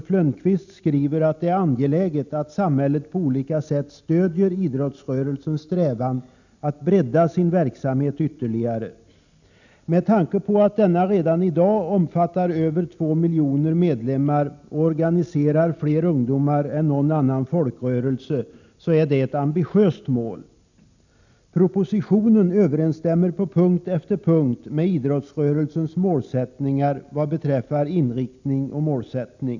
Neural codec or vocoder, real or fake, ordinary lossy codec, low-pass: none; real; none; 7.2 kHz